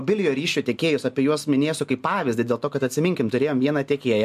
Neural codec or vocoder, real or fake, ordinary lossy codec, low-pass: none; real; MP3, 96 kbps; 14.4 kHz